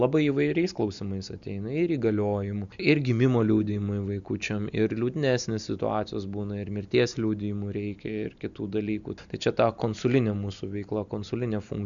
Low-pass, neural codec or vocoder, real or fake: 7.2 kHz; none; real